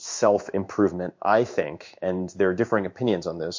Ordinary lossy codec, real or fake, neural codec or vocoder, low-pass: MP3, 48 kbps; fake; codec, 16 kHz in and 24 kHz out, 1 kbps, XY-Tokenizer; 7.2 kHz